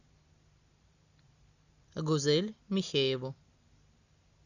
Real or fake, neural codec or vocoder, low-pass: real; none; 7.2 kHz